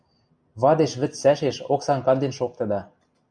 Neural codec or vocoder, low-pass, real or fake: none; 9.9 kHz; real